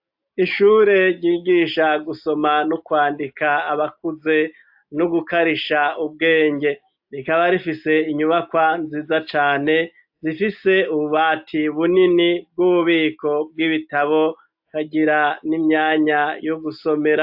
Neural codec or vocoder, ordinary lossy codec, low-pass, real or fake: none; AAC, 48 kbps; 5.4 kHz; real